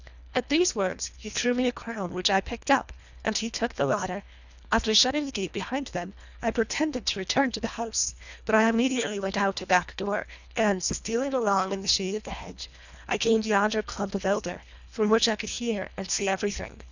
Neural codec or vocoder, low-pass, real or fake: codec, 24 kHz, 1.5 kbps, HILCodec; 7.2 kHz; fake